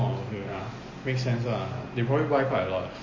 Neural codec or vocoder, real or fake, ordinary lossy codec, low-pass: none; real; MP3, 32 kbps; 7.2 kHz